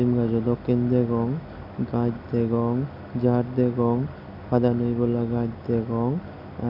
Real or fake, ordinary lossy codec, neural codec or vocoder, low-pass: real; none; none; 5.4 kHz